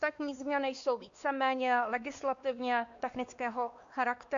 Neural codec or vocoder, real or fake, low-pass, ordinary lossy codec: codec, 16 kHz, 2 kbps, X-Codec, WavLM features, trained on Multilingual LibriSpeech; fake; 7.2 kHz; Opus, 64 kbps